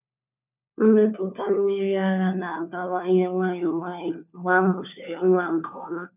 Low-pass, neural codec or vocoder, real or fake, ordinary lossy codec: 3.6 kHz; codec, 16 kHz, 4 kbps, FunCodec, trained on LibriTTS, 50 frames a second; fake; none